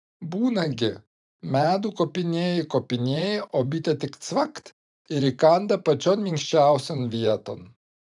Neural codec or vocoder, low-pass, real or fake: vocoder, 44.1 kHz, 128 mel bands every 512 samples, BigVGAN v2; 10.8 kHz; fake